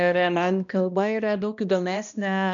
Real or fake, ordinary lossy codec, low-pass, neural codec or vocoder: fake; MP3, 96 kbps; 7.2 kHz; codec, 16 kHz, 1 kbps, X-Codec, HuBERT features, trained on balanced general audio